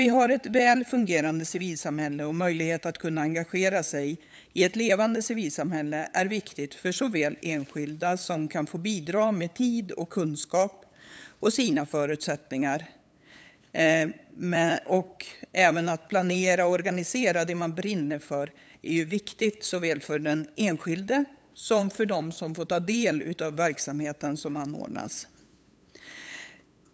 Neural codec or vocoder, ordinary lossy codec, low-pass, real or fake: codec, 16 kHz, 8 kbps, FunCodec, trained on LibriTTS, 25 frames a second; none; none; fake